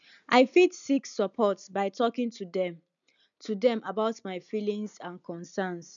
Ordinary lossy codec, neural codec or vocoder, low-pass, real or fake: none; none; 7.2 kHz; real